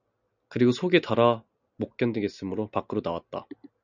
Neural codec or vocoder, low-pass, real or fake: none; 7.2 kHz; real